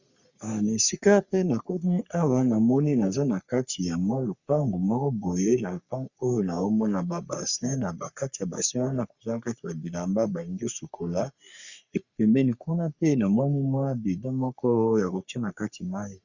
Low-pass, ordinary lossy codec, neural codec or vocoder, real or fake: 7.2 kHz; Opus, 64 kbps; codec, 44.1 kHz, 3.4 kbps, Pupu-Codec; fake